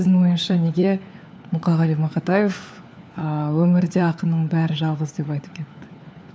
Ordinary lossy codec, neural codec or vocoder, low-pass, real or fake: none; codec, 16 kHz, 4 kbps, FunCodec, trained on LibriTTS, 50 frames a second; none; fake